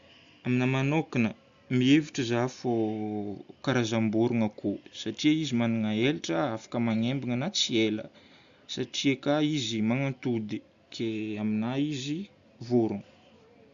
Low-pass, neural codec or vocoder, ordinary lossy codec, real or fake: 7.2 kHz; none; Opus, 64 kbps; real